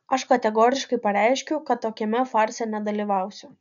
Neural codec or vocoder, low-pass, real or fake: none; 7.2 kHz; real